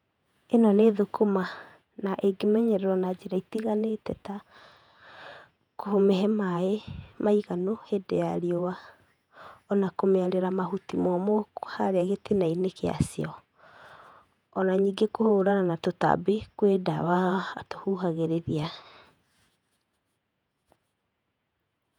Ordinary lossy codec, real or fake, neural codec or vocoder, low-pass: none; fake; vocoder, 48 kHz, 128 mel bands, Vocos; 19.8 kHz